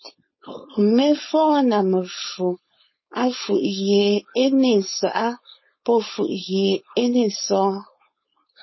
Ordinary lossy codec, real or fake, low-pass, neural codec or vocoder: MP3, 24 kbps; fake; 7.2 kHz; codec, 16 kHz, 4.8 kbps, FACodec